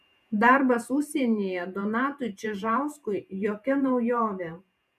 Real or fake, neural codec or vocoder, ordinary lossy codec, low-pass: fake; vocoder, 48 kHz, 128 mel bands, Vocos; MP3, 96 kbps; 14.4 kHz